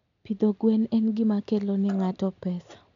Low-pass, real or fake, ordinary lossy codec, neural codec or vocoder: 7.2 kHz; real; none; none